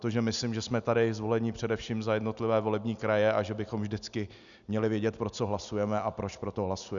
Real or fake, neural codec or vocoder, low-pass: real; none; 7.2 kHz